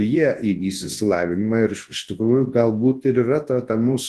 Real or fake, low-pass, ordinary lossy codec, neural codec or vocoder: fake; 10.8 kHz; Opus, 16 kbps; codec, 24 kHz, 0.9 kbps, WavTokenizer, large speech release